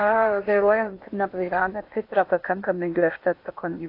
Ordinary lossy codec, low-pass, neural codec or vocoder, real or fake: AAC, 32 kbps; 5.4 kHz; codec, 16 kHz in and 24 kHz out, 0.8 kbps, FocalCodec, streaming, 65536 codes; fake